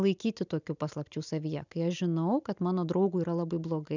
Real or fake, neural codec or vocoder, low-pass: real; none; 7.2 kHz